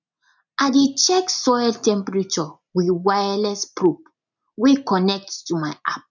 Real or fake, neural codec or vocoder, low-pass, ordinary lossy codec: real; none; 7.2 kHz; none